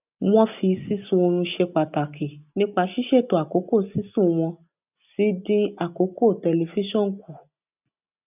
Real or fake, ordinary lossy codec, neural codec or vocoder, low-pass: real; none; none; 3.6 kHz